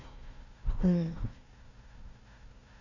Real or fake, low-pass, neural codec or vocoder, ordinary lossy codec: fake; 7.2 kHz; codec, 16 kHz, 1 kbps, FunCodec, trained on Chinese and English, 50 frames a second; none